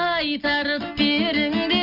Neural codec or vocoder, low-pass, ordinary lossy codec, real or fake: none; 5.4 kHz; none; real